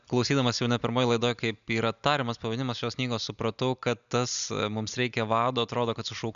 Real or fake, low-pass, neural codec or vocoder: real; 7.2 kHz; none